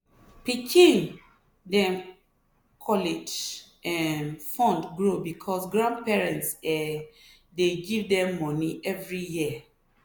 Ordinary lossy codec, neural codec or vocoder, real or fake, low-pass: none; none; real; none